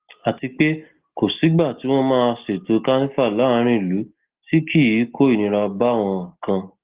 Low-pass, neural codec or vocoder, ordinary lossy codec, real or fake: 3.6 kHz; none; Opus, 16 kbps; real